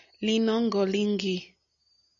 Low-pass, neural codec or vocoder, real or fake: 7.2 kHz; none; real